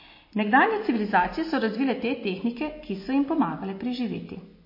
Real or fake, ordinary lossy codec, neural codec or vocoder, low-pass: real; MP3, 24 kbps; none; 5.4 kHz